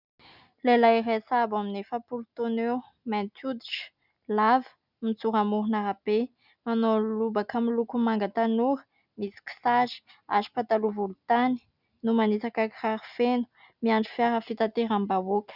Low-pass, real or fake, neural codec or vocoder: 5.4 kHz; real; none